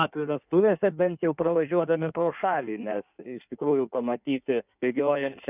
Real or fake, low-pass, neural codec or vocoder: fake; 3.6 kHz; codec, 16 kHz in and 24 kHz out, 1.1 kbps, FireRedTTS-2 codec